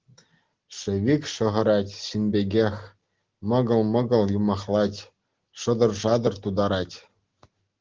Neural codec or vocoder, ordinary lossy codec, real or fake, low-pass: none; Opus, 16 kbps; real; 7.2 kHz